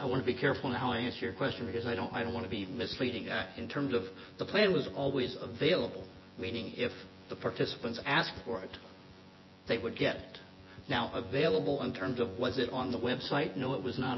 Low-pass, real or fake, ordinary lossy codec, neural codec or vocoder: 7.2 kHz; fake; MP3, 24 kbps; vocoder, 24 kHz, 100 mel bands, Vocos